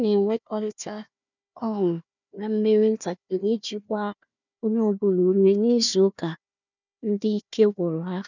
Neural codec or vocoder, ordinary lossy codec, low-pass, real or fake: codec, 16 kHz, 1 kbps, FunCodec, trained on Chinese and English, 50 frames a second; none; 7.2 kHz; fake